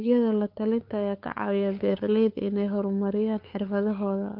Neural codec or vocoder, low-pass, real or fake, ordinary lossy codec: codec, 16 kHz, 8 kbps, FunCodec, trained on LibriTTS, 25 frames a second; 5.4 kHz; fake; Opus, 24 kbps